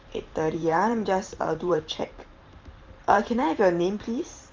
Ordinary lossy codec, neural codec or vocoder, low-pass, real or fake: Opus, 32 kbps; vocoder, 44.1 kHz, 128 mel bands every 512 samples, BigVGAN v2; 7.2 kHz; fake